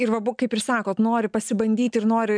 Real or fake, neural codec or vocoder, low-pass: real; none; 9.9 kHz